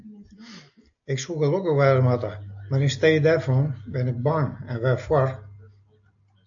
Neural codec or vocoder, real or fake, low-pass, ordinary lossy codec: none; real; 7.2 kHz; AAC, 64 kbps